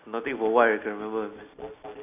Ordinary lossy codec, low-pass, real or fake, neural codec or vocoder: none; 3.6 kHz; real; none